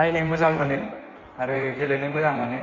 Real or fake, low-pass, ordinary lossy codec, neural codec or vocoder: fake; 7.2 kHz; none; codec, 16 kHz in and 24 kHz out, 1.1 kbps, FireRedTTS-2 codec